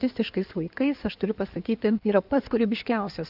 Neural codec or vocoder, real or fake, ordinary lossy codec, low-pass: codec, 16 kHz in and 24 kHz out, 2.2 kbps, FireRedTTS-2 codec; fake; AAC, 48 kbps; 5.4 kHz